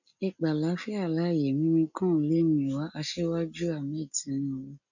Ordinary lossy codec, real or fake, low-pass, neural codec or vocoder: none; real; 7.2 kHz; none